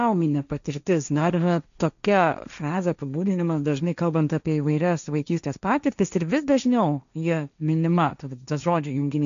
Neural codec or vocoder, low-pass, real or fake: codec, 16 kHz, 1.1 kbps, Voila-Tokenizer; 7.2 kHz; fake